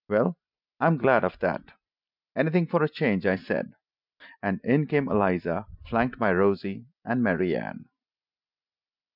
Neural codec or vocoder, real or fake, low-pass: none; real; 5.4 kHz